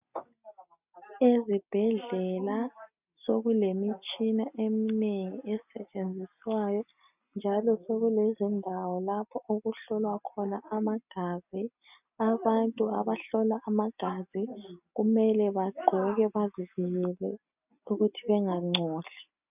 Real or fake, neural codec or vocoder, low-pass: real; none; 3.6 kHz